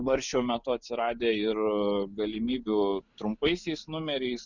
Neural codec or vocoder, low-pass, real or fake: none; 7.2 kHz; real